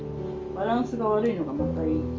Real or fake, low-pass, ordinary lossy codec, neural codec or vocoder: real; 7.2 kHz; Opus, 32 kbps; none